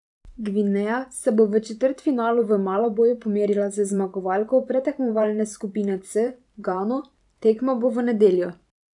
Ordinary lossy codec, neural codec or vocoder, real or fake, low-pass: none; vocoder, 44.1 kHz, 128 mel bands every 512 samples, BigVGAN v2; fake; 10.8 kHz